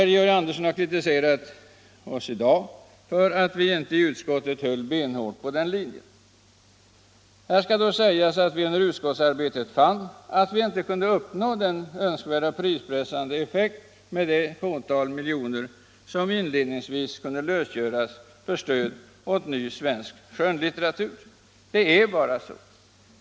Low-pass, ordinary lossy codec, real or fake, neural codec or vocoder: none; none; real; none